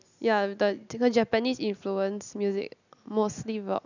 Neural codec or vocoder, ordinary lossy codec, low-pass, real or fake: none; none; 7.2 kHz; real